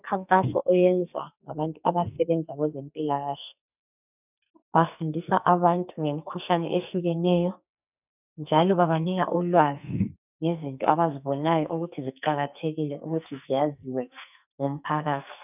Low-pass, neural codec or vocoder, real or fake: 3.6 kHz; codec, 44.1 kHz, 2.6 kbps, SNAC; fake